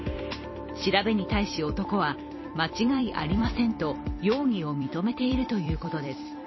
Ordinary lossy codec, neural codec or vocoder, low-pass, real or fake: MP3, 24 kbps; vocoder, 44.1 kHz, 128 mel bands every 256 samples, BigVGAN v2; 7.2 kHz; fake